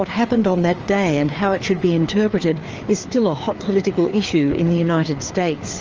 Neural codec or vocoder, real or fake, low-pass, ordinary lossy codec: codec, 16 kHz, 4 kbps, FreqCodec, larger model; fake; 7.2 kHz; Opus, 24 kbps